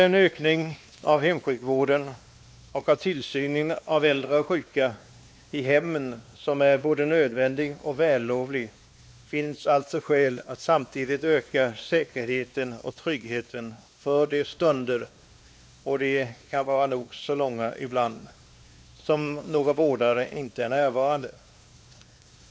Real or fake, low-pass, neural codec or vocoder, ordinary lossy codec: fake; none; codec, 16 kHz, 2 kbps, X-Codec, WavLM features, trained on Multilingual LibriSpeech; none